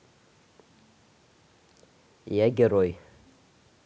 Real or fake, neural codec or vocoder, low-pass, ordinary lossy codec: real; none; none; none